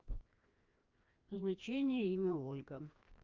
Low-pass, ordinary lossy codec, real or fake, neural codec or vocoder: 7.2 kHz; Opus, 24 kbps; fake; codec, 16 kHz, 1 kbps, FreqCodec, larger model